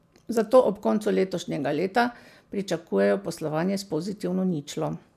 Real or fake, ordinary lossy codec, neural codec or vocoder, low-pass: real; MP3, 96 kbps; none; 14.4 kHz